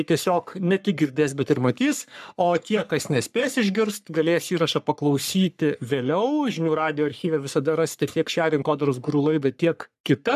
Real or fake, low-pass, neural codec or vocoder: fake; 14.4 kHz; codec, 44.1 kHz, 3.4 kbps, Pupu-Codec